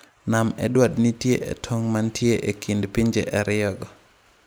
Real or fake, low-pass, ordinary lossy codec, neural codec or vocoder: real; none; none; none